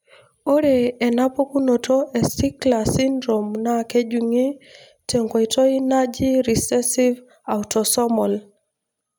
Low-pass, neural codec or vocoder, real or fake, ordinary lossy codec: none; none; real; none